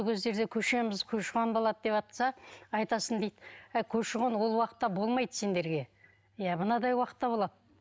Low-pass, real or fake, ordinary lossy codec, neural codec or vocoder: none; real; none; none